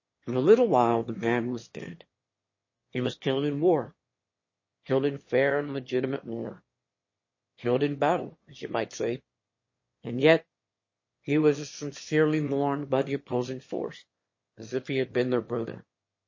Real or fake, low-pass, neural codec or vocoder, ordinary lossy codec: fake; 7.2 kHz; autoencoder, 22.05 kHz, a latent of 192 numbers a frame, VITS, trained on one speaker; MP3, 32 kbps